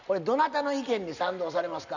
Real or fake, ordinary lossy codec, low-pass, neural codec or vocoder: real; none; 7.2 kHz; none